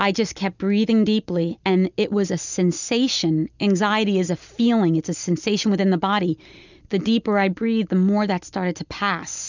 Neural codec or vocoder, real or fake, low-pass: none; real; 7.2 kHz